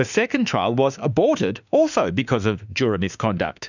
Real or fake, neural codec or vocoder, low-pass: fake; autoencoder, 48 kHz, 32 numbers a frame, DAC-VAE, trained on Japanese speech; 7.2 kHz